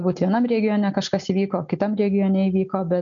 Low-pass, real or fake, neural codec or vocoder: 7.2 kHz; real; none